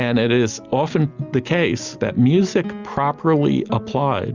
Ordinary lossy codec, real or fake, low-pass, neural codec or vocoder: Opus, 64 kbps; real; 7.2 kHz; none